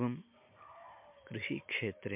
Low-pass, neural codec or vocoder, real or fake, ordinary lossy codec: 3.6 kHz; none; real; MP3, 32 kbps